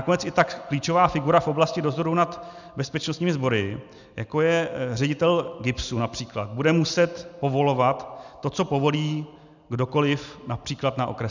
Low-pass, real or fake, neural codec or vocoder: 7.2 kHz; real; none